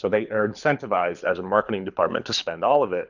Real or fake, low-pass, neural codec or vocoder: fake; 7.2 kHz; codec, 24 kHz, 6 kbps, HILCodec